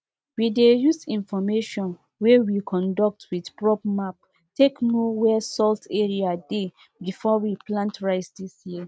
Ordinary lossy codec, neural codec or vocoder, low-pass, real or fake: none; none; none; real